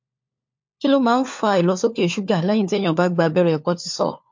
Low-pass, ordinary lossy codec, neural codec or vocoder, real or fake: 7.2 kHz; MP3, 48 kbps; codec, 16 kHz, 4 kbps, FunCodec, trained on LibriTTS, 50 frames a second; fake